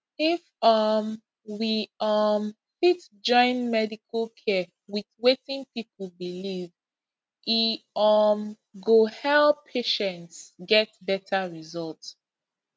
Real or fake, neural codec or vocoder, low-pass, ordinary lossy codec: real; none; none; none